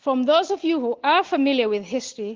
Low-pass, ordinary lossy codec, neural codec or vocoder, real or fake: 7.2 kHz; Opus, 16 kbps; none; real